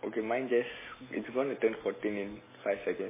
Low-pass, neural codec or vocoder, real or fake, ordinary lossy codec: 3.6 kHz; none; real; MP3, 16 kbps